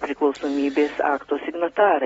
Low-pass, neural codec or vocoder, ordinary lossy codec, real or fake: 19.8 kHz; none; AAC, 24 kbps; real